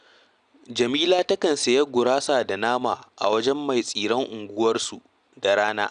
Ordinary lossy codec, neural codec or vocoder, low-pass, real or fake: none; none; 9.9 kHz; real